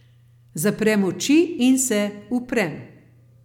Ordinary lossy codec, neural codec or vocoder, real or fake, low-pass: MP3, 96 kbps; none; real; 19.8 kHz